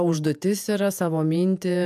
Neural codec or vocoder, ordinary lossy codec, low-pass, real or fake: vocoder, 48 kHz, 128 mel bands, Vocos; AAC, 96 kbps; 14.4 kHz; fake